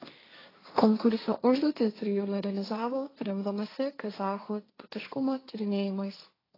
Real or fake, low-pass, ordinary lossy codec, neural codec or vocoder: fake; 5.4 kHz; AAC, 24 kbps; codec, 16 kHz, 1.1 kbps, Voila-Tokenizer